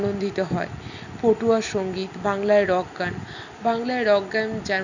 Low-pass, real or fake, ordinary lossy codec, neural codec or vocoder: 7.2 kHz; real; none; none